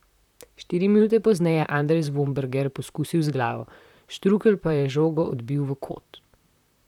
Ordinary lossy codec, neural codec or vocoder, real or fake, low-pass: none; vocoder, 44.1 kHz, 128 mel bands, Pupu-Vocoder; fake; 19.8 kHz